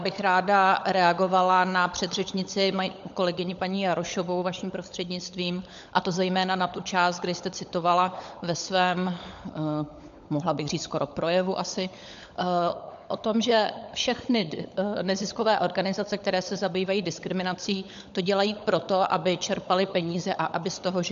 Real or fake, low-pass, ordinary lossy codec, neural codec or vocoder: fake; 7.2 kHz; MP3, 64 kbps; codec, 16 kHz, 16 kbps, FunCodec, trained on LibriTTS, 50 frames a second